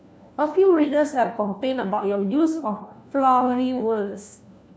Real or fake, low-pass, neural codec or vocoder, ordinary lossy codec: fake; none; codec, 16 kHz, 1 kbps, FunCodec, trained on LibriTTS, 50 frames a second; none